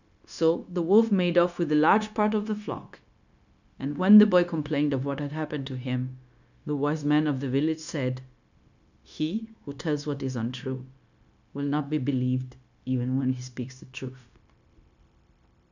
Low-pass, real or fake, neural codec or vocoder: 7.2 kHz; fake; codec, 16 kHz, 0.9 kbps, LongCat-Audio-Codec